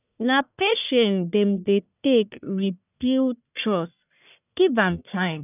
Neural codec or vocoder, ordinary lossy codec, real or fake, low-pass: codec, 44.1 kHz, 1.7 kbps, Pupu-Codec; none; fake; 3.6 kHz